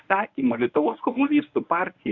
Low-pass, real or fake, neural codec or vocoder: 7.2 kHz; fake; codec, 24 kHz, 0.9 kbps, WavTokenizer, medium speech release version 1